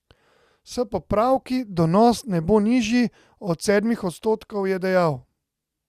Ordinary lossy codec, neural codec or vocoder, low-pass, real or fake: Opus, 64 kbps; none; 14.4 kHz; real